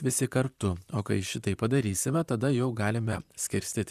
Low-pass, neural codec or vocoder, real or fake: 14.4 kHz; vocoder, 44.1 kHz, 128 mel bands, Pupu-Vocoder; fake